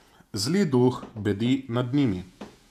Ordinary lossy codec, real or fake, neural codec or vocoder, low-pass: none; fake; vocoder, 48 kHz, 128 mel bands, Vocos; 14.4 kHz